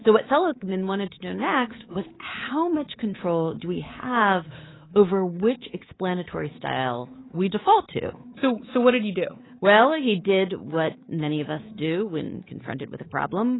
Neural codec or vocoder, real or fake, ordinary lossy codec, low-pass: codec, 24 kHz, 3.1 kbps, DualCodec; fake; AAC, 16 kbps; 7.2 kHz